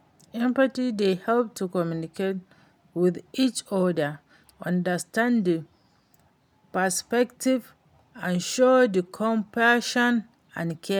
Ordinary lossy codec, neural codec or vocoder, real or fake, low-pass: none; none; real; none